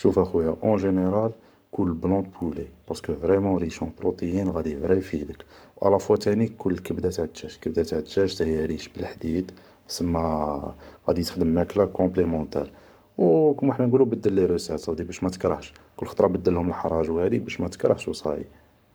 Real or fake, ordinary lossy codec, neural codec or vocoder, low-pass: fake; none; codec, 44.1 kHz, 7.8 kbps, Pupu-Codec; none